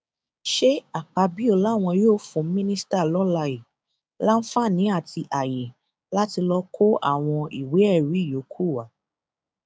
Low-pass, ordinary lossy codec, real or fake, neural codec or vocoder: none; none; fake; codec, 16 kHz, 6 kbps, DAC